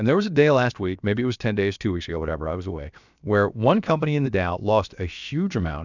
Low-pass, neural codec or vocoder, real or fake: 7.2 kHz; codec, 16 kHz, about 1 kbps, DyCAST, with the encoder's durations; fake